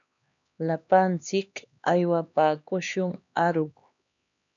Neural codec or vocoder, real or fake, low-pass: codec, 16 kHz, 2 kbps, X-Codec, HuBERT features, trained on LibriSpeech; fake; 7.2 kHz